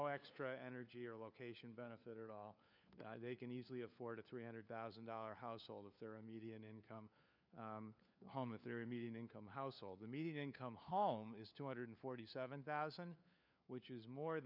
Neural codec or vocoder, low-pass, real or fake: codec, 16 kHz, 4 kbps, FunCodec, trained on LibriTTS, 50 frames a second; 5.4 kHz; fake